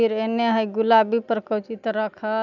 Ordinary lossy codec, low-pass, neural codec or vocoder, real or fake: none; 7.2 kHz; none; real